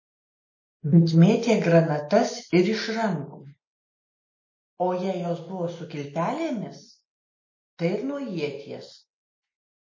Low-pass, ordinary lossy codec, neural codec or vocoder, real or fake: 7.2 kHz; MP3, 32 kbps; none; real